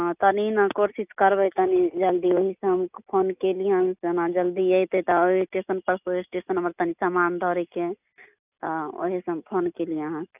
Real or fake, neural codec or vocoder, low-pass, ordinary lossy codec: real; none; 3.6 kHz; none